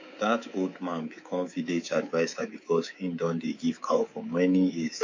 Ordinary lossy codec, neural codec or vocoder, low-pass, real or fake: MP3, 48 kbps; codec, 24 kHz, 3.1 kbps, DualCodec; 7.2 kHz; fake